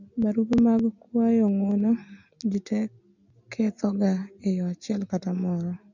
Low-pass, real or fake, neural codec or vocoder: 7.2 kHz; real; none